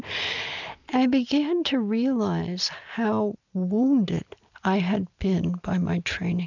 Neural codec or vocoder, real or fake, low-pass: none; real; 7.2 kHz